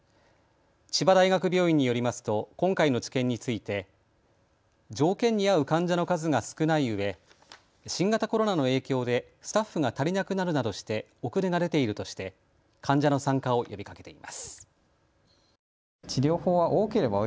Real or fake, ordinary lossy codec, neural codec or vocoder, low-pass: real; none; none; none